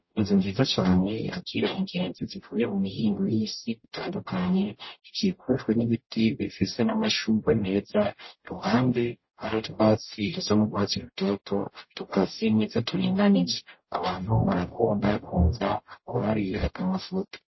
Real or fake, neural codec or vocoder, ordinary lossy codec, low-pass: fake; codec, 44.1 kHz, 0.9 kbps, DAC; MP3, 24 kbps; 7.2 kHz